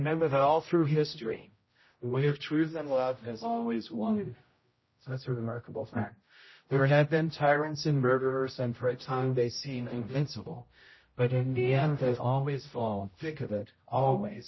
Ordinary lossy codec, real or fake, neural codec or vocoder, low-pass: MP3, 24 kbps; fake; codec, 16 kHz, 0.5 kbps, X-Codec, HuBERT features, trained on general audio; 7.2 kHz